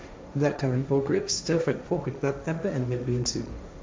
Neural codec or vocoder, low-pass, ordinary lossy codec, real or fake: codec, 16 kHz, 1.1 kbps, Voila-Tokenizer; none; none; fake